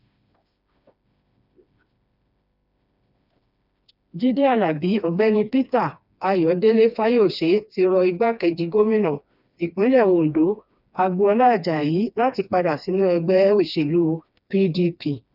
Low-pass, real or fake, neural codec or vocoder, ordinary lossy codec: 5.4 kHz; fake; codec, 16 kHz, 2 kbps, FreqCodec, smaller model; none